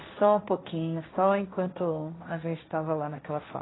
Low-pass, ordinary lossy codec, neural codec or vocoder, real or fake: 7.2 kHz; AAC, 16 kbps; codec, 16 kHz, 1.1 kbps, Voila-Tokenizer; fake